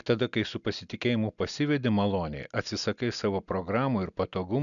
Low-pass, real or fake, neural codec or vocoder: 7.2 kHz; real; none